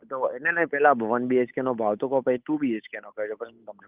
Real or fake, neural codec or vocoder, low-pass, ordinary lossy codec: fake; codec, 16 kHz, 8 kbps, FunCodec, trained on Chinese and English, 25 frames a second; 3.6 kHz; Opus, 24 kbps